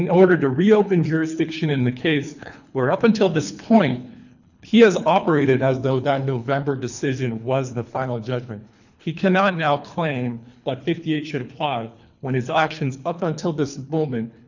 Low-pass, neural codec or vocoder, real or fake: 7.2 kHz; codec, 24 kHz, 3 kbps, HILCodec; fake